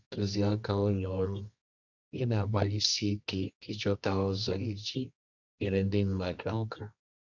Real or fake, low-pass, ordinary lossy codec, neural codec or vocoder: fake; 7.2 kHz; none; codec, 24 kHz, 0.9 kbps, WavTokenizer, medium music audio release